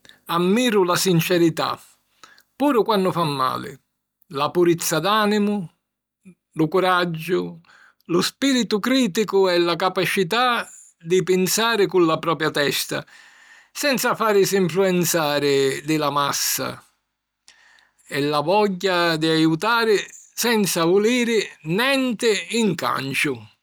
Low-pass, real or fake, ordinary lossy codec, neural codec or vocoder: none; real; none; none